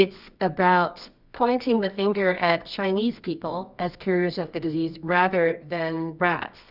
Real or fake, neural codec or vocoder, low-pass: fake; codec, 24 kHz, 0.9 kbps, WavTokenizer, medium music audio release; 5.4 kHz